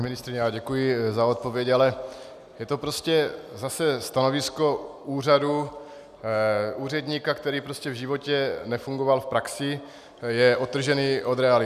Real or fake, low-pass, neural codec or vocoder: real; 14.4 kHz; none